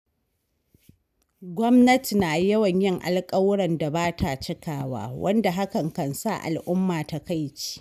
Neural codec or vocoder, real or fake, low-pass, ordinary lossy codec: none; real; 14.4 kHz; none